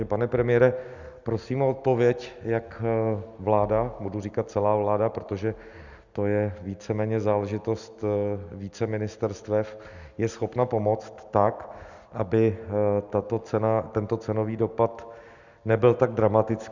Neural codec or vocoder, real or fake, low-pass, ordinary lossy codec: none; real; 7.2 kHz; Opus, 64 kbps